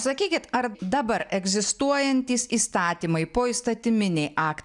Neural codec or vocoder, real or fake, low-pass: none; real; 10.8 kHz